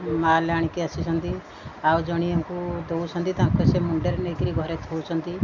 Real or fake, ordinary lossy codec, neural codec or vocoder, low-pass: real; none; none; 7.2 kHz